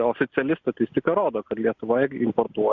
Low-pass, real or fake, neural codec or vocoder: 7.2 kHz; real; none